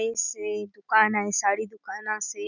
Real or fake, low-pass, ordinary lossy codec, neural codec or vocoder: real; 7.2 kHz; none; none